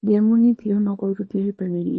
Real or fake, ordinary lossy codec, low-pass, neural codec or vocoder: fake; MP3, 32 kbps; 10.8 kHz; codec, 24 kHz, 0.9 kbps, WavTokenizer, small release